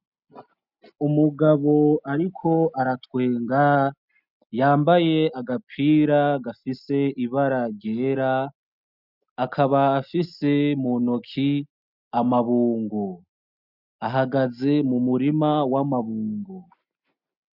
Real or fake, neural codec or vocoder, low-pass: real; none; 5.4 kHz